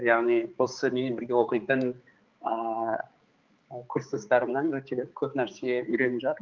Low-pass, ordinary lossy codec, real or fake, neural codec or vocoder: 7.2 kHz; Opus, 24 kbps; fake; codec, 16 kHz, 4 kbps, X-Codec, HuBERT features, trained on balanced general audio